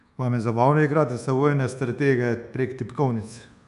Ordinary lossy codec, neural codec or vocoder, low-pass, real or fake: none; codec, 24 kHz, 1.2 kbps, DualCodec; 10.8 kHz; fake